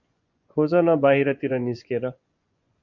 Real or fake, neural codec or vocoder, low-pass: fake; vocoder, 24 kHz, 100 mel bands, Vocos; 7.2 kHz